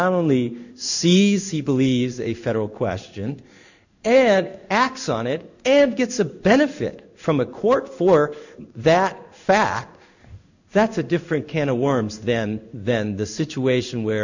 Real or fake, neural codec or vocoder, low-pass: fake; codec, 16 kHz in and 24 kHz out, 1 kbps, XY-Tokenizer; 7.2 kHz